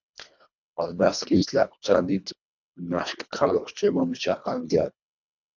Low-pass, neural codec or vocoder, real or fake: 7.2 kHz; codec, 24 kHz, 1.5 kbps, HILCodec; fake